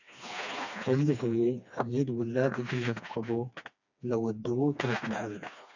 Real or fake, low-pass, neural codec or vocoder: fake; 7.2 kHz; codec, 16 kHz, 2 kbps, FreqCodec, smaller model